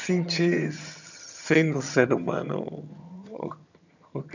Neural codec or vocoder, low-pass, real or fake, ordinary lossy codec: vocoder, 22.05 kHz, 80 mel bands, HiFi-GAN; 7.2 kHz; fake; none